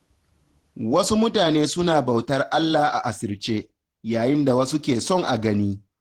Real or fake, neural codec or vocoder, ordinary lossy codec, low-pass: fake; vocoder, 48 kHz, 128 mel bands, Vocos; Opus, 16 kbps; 19.8 kHz